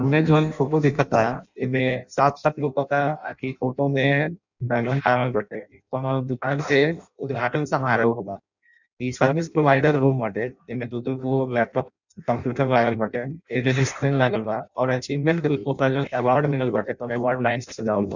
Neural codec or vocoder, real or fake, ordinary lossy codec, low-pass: codec, 16 kHz in and 24 kHz out, 0.6 kbps, FireRedTTS-2 codec; fake; none; 7.2 kHz